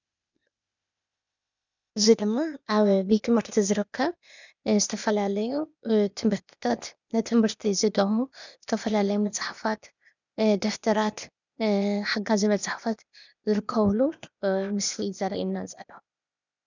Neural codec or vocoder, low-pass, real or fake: codec, 16 kHz, 0.8 kbps, ZipCodec; 7.2 kHz; fake